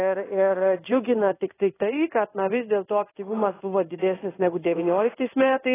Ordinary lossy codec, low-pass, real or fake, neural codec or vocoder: AAC, 16 kbps; 3.6 kHz; fake; codec, 16 kHz in and 24 kHz out, 1 kbps, XY-Tokenizer